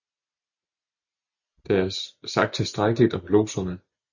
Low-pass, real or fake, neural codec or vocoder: 7.2 kHz; real; none